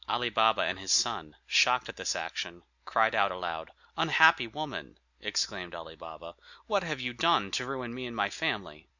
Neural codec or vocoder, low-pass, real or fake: none; 7.2 kHz; real